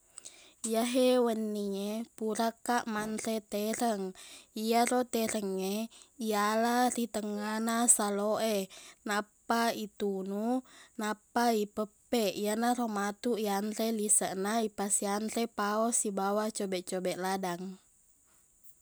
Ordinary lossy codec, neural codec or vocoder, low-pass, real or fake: none; none; none; real